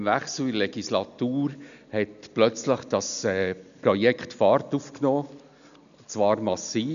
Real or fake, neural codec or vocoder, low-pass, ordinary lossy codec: real; none; 7.2 kHz; none